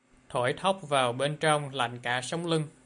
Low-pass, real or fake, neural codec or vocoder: 9.9 kHz; real; none